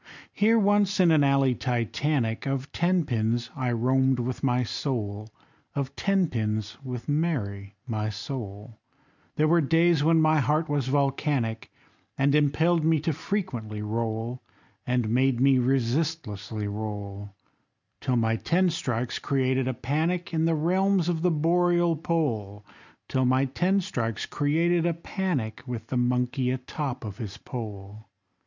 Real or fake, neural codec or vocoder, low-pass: real; none; 7.2 kHz